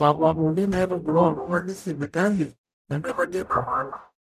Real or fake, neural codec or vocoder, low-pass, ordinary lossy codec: fake; codec, 44.1 kHz, 0.9 kbps, DAC; 14.4 kHz; none